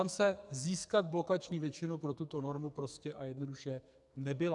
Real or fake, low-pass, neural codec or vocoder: fake; 10.8 kHz; codec, 44.1 kHz, 2.6 kbps, SNAC